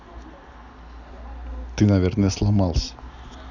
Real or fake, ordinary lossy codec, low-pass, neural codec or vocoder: real; none; 7.2 kHz; none